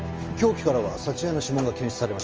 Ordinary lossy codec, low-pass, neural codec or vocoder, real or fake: Opus, 24 kbps; 7.2 kHz; none; real